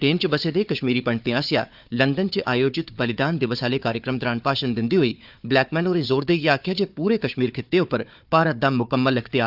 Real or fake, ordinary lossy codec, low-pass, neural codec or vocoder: fake; none; 5.4 kHz; codec, 16 kHz, 4 kbps, FunCodec, trained on Chinese and English, 50 frames a second